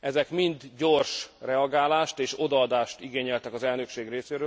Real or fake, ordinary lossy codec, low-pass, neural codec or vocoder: real; none; none; none